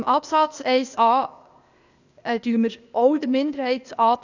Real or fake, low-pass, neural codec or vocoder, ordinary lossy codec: fake; 7.2 kHz; codec, 16 kHz, 0.8 kbps, ZipCodec; none